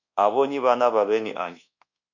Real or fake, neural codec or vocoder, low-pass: fake; codec, 24 kHz, 1.2 kbps, DualCodec; 7.2 kHz